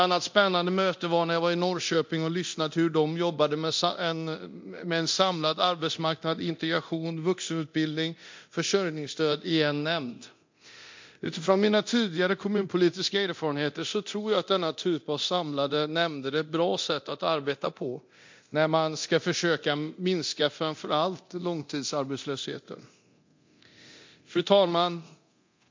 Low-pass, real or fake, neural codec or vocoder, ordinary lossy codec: 7.2 kHz; fake; codec, 24 kHz, 0.9 kbps, DualCodec; MP3, 48 kbps